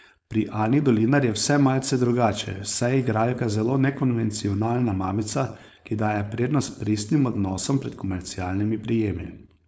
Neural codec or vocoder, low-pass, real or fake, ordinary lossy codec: codec, 16 kHz, 4.8 kbps, FACodec; none; fake; none